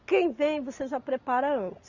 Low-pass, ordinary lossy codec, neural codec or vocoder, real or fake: 7.2 kHz; Opus, 64 kbps; none; real